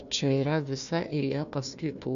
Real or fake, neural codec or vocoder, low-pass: fake; codec, 16 kHz, 1 kbps, FunCodec, trained on Chinese and English, 50 frames a second; 7.2 kHz